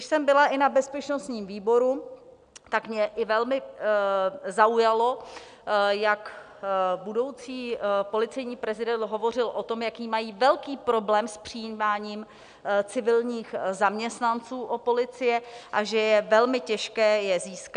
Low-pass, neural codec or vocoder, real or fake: 9.9 kHz; none; real